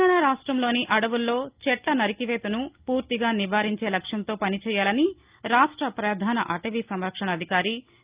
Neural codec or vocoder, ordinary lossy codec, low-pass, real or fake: none; Opus, 32 kbps; 3.6 kHz; real